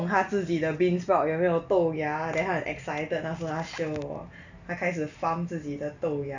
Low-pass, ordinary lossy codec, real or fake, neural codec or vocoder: 7.2 kHz; none; real; none